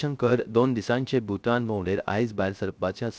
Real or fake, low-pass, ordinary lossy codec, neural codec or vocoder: fake; none; none; codec, 16 kHz, 0.3 kbps, FocalCodec